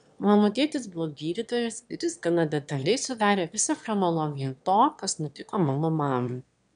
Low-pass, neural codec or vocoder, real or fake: 9.9 kHz; autoencoder, 22.05 kHz, a latent of 192 numbers a frame, VITS, trained on one speaker; fake